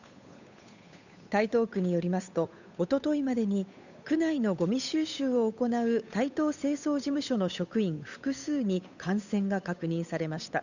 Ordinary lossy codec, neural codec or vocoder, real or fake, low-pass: none; codec, 16 kHz, 8 kbps, FunCodec, trained on Chinese and English, 25 frames a second; fake; 7.2 kHz